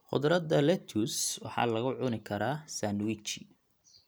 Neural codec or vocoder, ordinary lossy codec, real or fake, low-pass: none; none; real; none